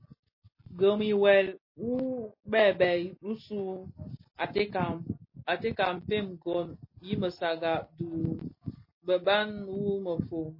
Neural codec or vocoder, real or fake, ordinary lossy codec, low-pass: none; real; MP3, 24 kbps; 5.4 kHz